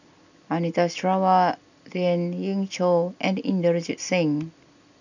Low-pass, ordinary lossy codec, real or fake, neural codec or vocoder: 7.2 kHz; none; real; none